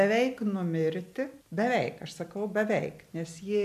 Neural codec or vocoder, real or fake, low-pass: none; real; 14.4 kHz